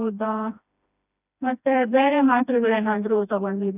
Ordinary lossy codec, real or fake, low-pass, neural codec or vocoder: none; fake; 3.6 kHz; codec, 16 kHz, 1 kbps, FreqCodec, smaller model